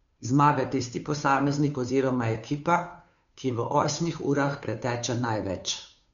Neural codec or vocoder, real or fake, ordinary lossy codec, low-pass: codec, 16 kHz, 2 kbps, FunCodec, trained on Chinese and English, 25 frames a second; fake; none; 7.2 kHz